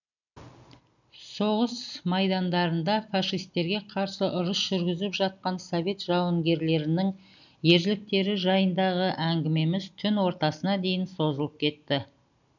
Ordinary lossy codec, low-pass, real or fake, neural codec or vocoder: none; 7.2 kHz; real; none